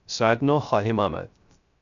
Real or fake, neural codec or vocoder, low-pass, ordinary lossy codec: fake; codec, 16 kHz, 0.3 kbps, FocalCodec; 7.2 kHz; MP3, 64 kbps